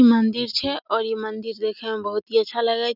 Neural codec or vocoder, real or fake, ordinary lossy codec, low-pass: none; real; none; 5.4 kHz